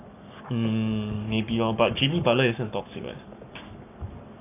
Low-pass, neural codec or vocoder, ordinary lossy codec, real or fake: 3.6 kHz; codec, 44.1 kHz, 7.8 kbps, Pupu-Codec; none; fake